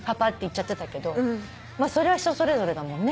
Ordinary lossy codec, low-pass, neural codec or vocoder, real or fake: none; none; none; real